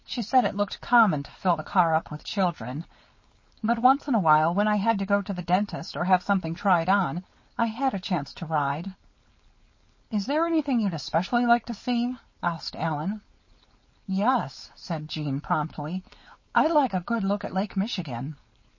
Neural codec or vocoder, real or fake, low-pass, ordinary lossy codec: codec, 16 kHz, 4.8 kbps, FACodec; fake; 7.2 kHz; MP3, 32 kbps